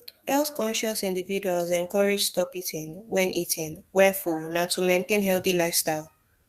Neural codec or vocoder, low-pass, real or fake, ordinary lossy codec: codec, 32 kHz, 1.9 kbps, SNAC; 14.4 kHz; fake; Opus, 64 kbps